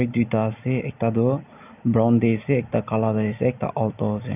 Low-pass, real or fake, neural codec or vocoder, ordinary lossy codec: 3.6 kHz; real; none; none